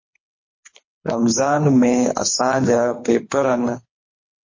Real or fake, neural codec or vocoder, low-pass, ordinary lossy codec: fake; codec, 24 kHz, 3 kbps, HILCodec; 7.2 kHz; MP3, 32 kbps